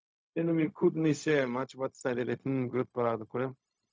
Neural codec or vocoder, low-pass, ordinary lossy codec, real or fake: codec, 16 kHz, 0.4 kbps, LongCat-Audio-Codec; none; none; fake